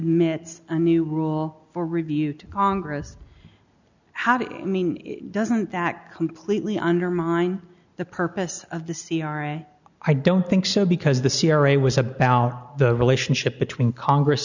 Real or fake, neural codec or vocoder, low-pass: real; none; 7.2 kHz